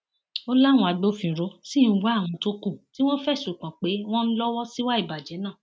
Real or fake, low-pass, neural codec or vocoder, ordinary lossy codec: real; none; none; none